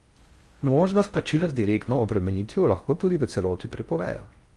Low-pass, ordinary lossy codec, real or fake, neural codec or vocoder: 10.8 kHz; Opus, 32 kbps; fake; codec, 16 kHz in and 24 kHz out, 0.6 kbps, FocalCodec, streaming, 4096 codes